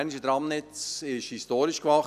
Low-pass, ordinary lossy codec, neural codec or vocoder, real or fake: 14.4 kHz; none; none; real